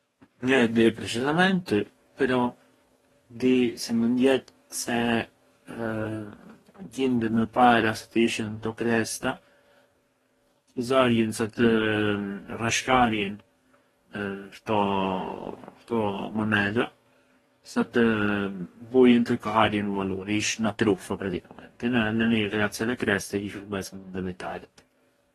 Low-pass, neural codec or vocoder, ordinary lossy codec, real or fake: 19.8 kHz; codec, 44.1 kHz, 2.6 kbps, DAC; AAC, 32 kbps; fake